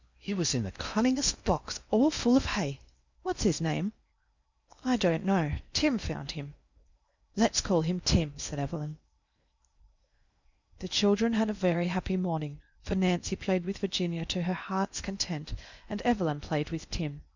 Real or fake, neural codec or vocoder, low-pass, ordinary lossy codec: fake; codec, 16 kHz in and 24 kHz out, 0.8 kbps, FocalCodec, streaming, 65536 codes; 7.2 kHz; Opus, 64 kbps